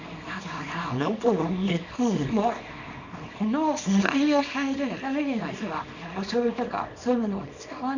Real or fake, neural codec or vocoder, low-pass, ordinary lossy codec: fake; codec, 24 kHz, 0.9 kbps, WavTokenizer, small release; 7.2 kHz; none